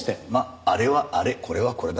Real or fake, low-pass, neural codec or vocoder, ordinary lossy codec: real; none; none; none